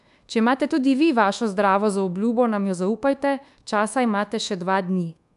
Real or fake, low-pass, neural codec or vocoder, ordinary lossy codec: fake; 10.8 kHz; codec, 24 kHz, 1.2 kbps, DualCodec; AAC, 96 kbps